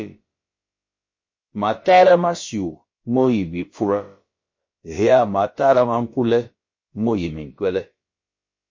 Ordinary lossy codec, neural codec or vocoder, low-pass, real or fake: MP3, 32 kbps; codec, 16 kHz, about 1 kbps, DyCAST, with the encoder's durations; 7.2 kHz; fake